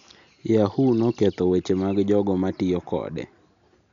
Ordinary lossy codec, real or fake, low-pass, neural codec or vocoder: none; real; 7.2 kHz; none